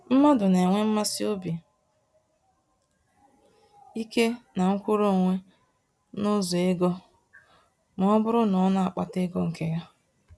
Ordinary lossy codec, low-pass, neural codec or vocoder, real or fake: none; none; none; real